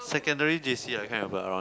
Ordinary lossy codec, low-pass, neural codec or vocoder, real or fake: none; none; none; real